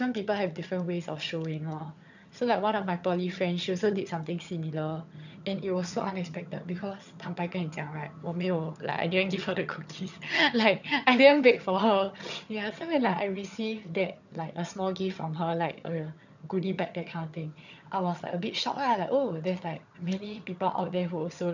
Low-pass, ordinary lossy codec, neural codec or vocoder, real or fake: 7.2 kHz; none; vocoder, 22.05 kHz, 80 mel bands, HiFi-GAN; fake